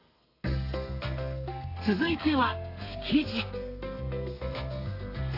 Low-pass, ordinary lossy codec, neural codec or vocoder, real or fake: 5.4 kHz; AAC, 32 kbps; codec, 32 kHz, 1.9 kbps, SNAC; fake